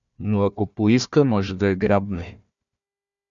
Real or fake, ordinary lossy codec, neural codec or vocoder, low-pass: fake; MP3, 96 kbps; codec, 16 kHz, 1 kbps, FunCodec, trained on Chinese and English, 50 frames a second; 7.2 kHz